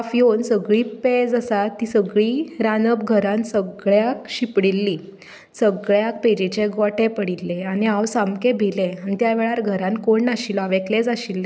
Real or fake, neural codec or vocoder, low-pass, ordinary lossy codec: real; none; none; none